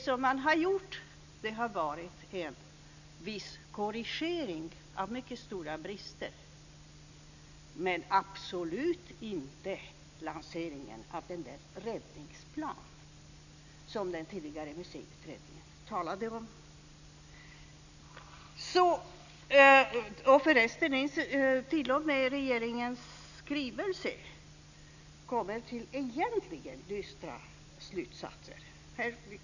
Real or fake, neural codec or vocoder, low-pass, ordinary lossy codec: real; none; 7.2 kHz; none